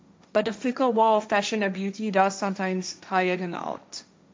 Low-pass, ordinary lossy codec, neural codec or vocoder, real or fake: none; none; codec, 16 kHz, 1.1 kbps, Voila-Tokenizer; fake